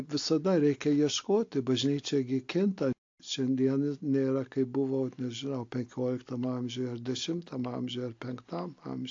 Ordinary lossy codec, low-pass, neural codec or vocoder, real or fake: AAC, 48 kbps; 7.2 kHz; none; real